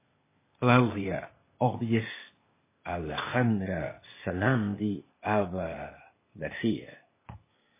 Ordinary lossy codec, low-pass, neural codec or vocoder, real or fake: MP3, 16 kbps; 3.6 kHz; codec, 16 kHz, 0.8 kbps, ZipCodec; fake